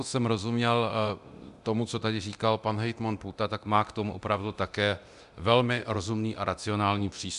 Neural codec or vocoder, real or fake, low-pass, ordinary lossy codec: codec, 24 kHz, 0.9 kbps, DualCodec; fake; 10.8 kHz; Opus, 64 kbps